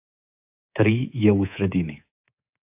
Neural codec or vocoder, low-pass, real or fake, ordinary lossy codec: codec, 24 kHz, 3.1 kbps, DualCodec; 3.6 kHz; fake; AAC, 32 kbps